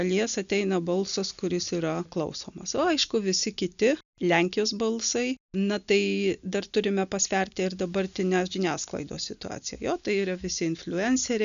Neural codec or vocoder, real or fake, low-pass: none; real; 7.2 kHz